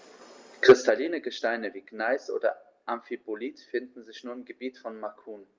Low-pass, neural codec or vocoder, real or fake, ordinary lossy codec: 7.2 kHz; none; real; Opus, 32 kbps